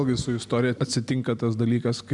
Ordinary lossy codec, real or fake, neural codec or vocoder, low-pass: MP3, 96 kbps; real; none; 10.8 kHz